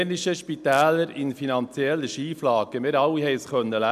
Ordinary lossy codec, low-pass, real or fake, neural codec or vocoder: MP3, 96 kbps; 14.4 kHz; real; none